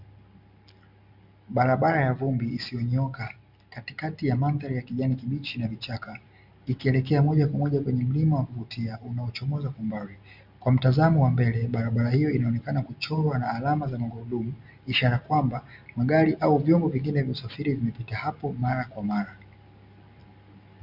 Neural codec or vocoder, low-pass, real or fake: none; 5.4 kHz; real